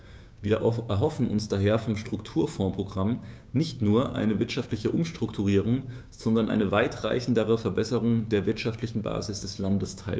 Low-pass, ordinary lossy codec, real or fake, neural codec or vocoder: none; none; fake; codec, 16 kHz, 6 kbps, DAC